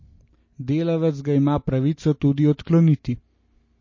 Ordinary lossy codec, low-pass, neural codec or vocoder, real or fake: MP3, 32 kbps; 7.2 kHz; none; real